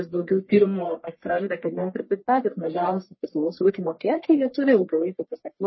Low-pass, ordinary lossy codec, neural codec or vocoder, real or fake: 7.2 kHz; MP3, 24 kbps; codec, 44.1 kHz, 1.7 kbps, Pupu-Codec; fake